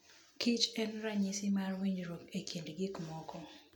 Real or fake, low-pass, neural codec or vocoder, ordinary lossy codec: real; none; none; none